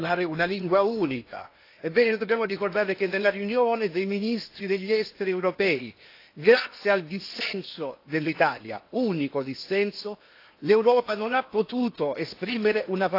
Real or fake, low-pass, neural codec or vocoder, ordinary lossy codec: fake; 5.4 kHz; codec, 16 kHz in and 24 kHz out, 0.8 kbps, FocalCodec, streaming, 65536 codes; AAC, 32 kbps